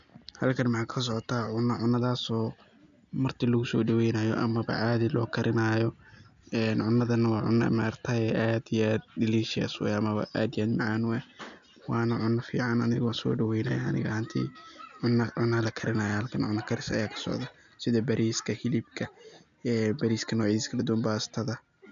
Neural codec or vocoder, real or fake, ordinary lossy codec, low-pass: none; real; none; 7.2 kHz